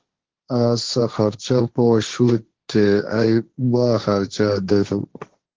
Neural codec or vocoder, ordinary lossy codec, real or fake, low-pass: codec, 16 kHz, 1.1 kbps, Voila-Tokenizer; Opus, 16 kbps; fake; 7.2 kHz